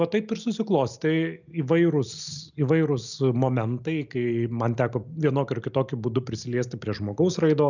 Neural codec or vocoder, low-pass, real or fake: none; 7.2 kHz; real